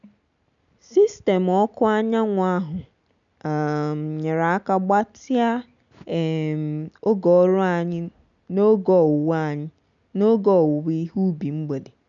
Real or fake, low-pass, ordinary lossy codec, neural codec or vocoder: real; 7.2 kHz; none; none